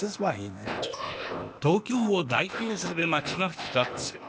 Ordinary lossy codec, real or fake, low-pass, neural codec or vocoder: none; fake; none; codec, 16 kHz, 0.8 kbps, ZipCodec